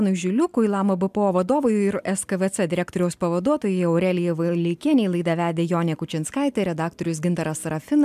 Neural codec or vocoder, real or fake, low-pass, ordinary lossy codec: none; real; 14.4 kHz; MP3, 96 kbps